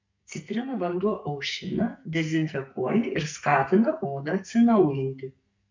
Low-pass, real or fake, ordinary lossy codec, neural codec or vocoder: 7.2 kHz; fake; MP3, 64 kbps; codec, 44.1 kHz, 2.6 kbps, SNAC